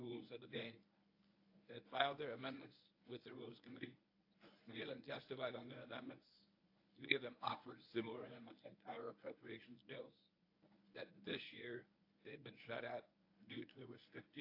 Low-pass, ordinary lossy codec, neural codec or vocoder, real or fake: 5.4 kHz; AAC, 32 kbps; codec, 24 kHz, 0.9 kbps, WavTokenizer, medium speech release version 1; fake